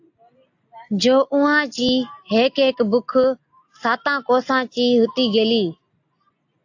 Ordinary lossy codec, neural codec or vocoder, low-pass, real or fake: AAC, 48 kbps; none; 7.2 kHz; real